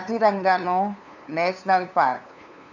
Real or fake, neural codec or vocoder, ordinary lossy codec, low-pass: fake; codec, 16 kHz, 2 kbps, FunCodec, trained on LibriTTS, 25 frames a second; none; 7.2 kHz